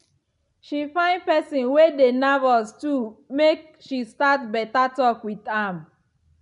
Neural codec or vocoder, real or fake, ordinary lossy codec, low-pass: none; real; none; 10.8 kHz